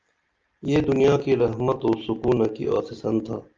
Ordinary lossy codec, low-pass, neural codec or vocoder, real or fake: Opus, 24 kbps; 7.2 kHz; none; real